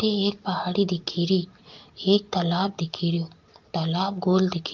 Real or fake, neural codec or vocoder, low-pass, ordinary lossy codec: real; none; 7.2 kHz; Opus, 32 kbps